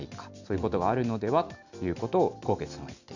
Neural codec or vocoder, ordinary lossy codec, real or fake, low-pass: none; none; real; 7.2 kHz